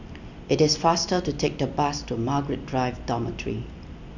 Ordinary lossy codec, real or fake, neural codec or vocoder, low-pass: none; real; none; 7.2 kHz